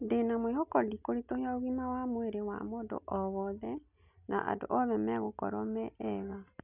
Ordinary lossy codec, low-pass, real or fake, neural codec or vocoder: none; 3.6 kHz; real; none